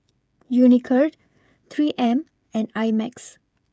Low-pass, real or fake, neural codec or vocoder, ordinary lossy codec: none; fake; codec, 16 kHz, 16 kbps, FreqCodec, smaller model; none